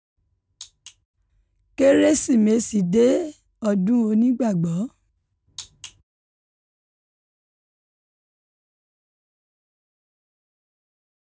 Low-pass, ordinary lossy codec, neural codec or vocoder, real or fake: none; none; none; real